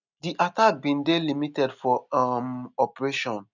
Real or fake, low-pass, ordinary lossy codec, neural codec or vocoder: real; 7.2 kHz; none; none